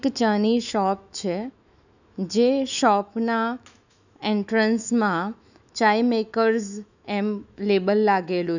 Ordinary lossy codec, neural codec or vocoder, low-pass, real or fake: none; autoencoder, 48 kHz, 128 numbers a frame, DAC-VAE, trained on Japanese speech; 7.2 kHz; fake